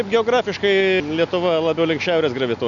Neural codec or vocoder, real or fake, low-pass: none; real; 7.2 kHz